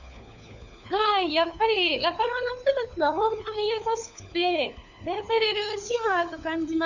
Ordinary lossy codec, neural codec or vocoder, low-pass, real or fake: none; codec, 16 kHz, 4 kbps, FunCodec, trained on LibriTTS, 50 frames a second; 7.2 kHz; fake